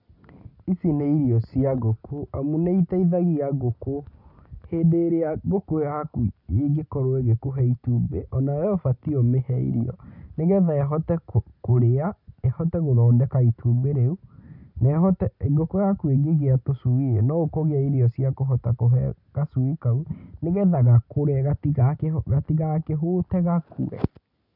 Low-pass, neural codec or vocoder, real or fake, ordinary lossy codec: 5.4 kHz; none; real; none